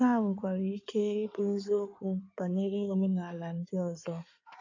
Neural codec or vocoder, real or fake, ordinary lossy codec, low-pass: codec, 16 kHz in and 24 kHz out, 2.2 kbps, FireRedTTS-2 codec; fake; AAC, 48 kbps; 7.2 kHz